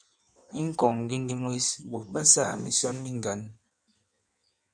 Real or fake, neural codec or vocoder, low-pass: fake; codec, 16 kHz in and 24 kHz out, 1.1 kbps, FireRedTTS-2 codec; 9.9 kHz